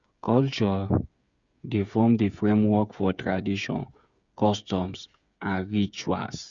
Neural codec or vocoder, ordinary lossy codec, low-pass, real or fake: codec, 16 kHz, 8 kbps, FreqCodec, smaller model; Opus, 64 kbps; 7.2 kHz; fake